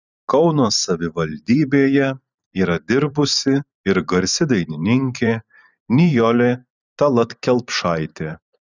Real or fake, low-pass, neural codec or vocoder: fake; 7.2 kHz; vocoder, 44.1 kHz, 128 mel bands every 512 samples, BigVGAN v2